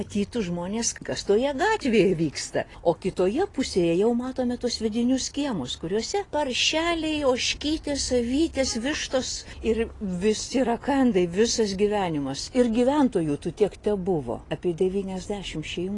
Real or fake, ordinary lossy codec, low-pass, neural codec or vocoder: real; AAC, 32 kbps; 10.8 kHz; none